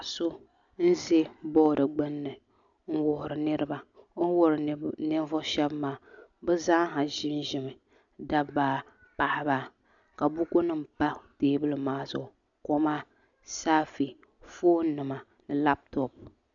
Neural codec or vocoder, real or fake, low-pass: none; real; 7.2 kHz